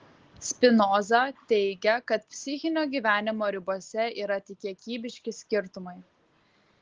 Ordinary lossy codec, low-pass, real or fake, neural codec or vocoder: Opus, 16 kbps; 7.2 kHz; real; none